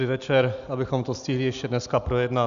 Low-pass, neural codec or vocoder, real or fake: 7.2 kHz; none; real